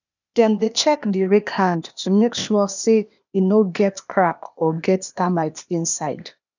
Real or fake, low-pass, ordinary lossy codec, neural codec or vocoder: fake; 7.2 kHz; none; codec, 16 kHz, 0.8 kbps, ZipCodec